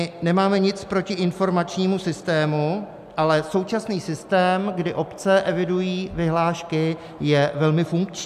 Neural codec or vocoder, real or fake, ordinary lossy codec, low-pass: none; real; MP3, 96 kbps; 14.4 kHz